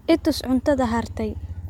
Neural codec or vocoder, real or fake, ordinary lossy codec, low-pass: none; real; MP3, 96 kbps; 19.8 kHz